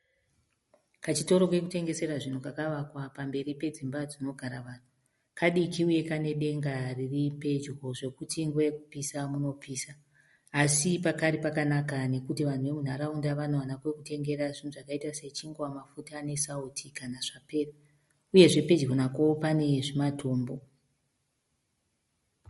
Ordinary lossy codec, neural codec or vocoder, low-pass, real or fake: MP3, 48 kbps; none; 19.8 kHz; real